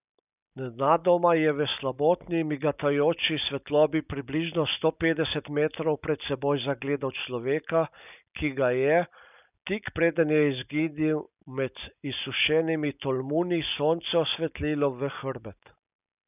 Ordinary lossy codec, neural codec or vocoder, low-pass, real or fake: none; none; 3.6 kHz; real